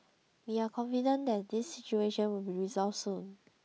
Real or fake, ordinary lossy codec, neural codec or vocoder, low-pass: real; none; none; none